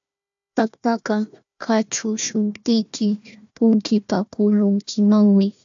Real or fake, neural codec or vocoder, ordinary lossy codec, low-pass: fake; codec, 16 kHz, 1 kbps, FunCodec, trained on Chinese and English, 50 frames a second; AAC, 64 kbps; 7.2 kHz